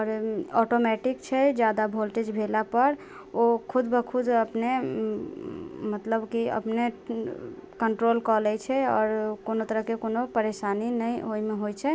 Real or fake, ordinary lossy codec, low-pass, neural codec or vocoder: real; none; none; none